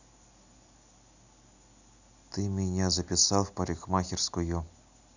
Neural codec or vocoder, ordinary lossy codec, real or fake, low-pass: none; none; real; 7.2 kHz